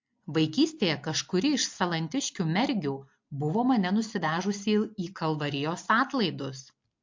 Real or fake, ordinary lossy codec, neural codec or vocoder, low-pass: real; MP3, 48 kbps; none; 7.2 kHz